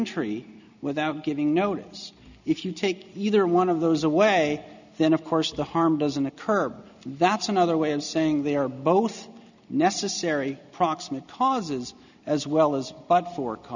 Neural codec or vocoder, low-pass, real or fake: none; 7.2 kHz; real